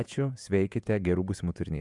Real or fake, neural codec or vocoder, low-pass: fake; vocoder, 48 kHz, 128 mel bands, Vocos; 10.8 kHz